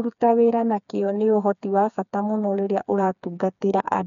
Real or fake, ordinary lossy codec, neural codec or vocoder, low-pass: fake; none; codec, 16 kHz, 4 kbps, FreqCodec, smaller model; 7.2 kHz